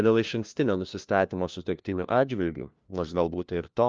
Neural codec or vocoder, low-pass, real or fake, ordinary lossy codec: codec, 16 kHz, 1 kbps, FunCodec, trained on LibriTTS, 50 frames a second; 7.2 kHz; fake; Opus, 24 kbps